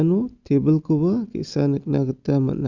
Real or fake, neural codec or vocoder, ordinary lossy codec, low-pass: real; none; Opus, 64 kbps; 7.2 kHz